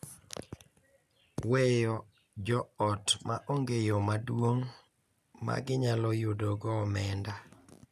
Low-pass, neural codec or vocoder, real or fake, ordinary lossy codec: 14.4 kHz; none; real; none